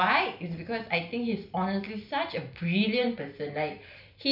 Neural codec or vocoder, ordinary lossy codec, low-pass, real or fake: none; none; 5.4 kHz; real